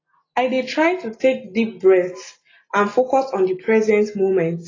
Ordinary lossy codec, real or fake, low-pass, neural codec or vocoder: AAC, 32 kbps; real; 7.2 kHz; none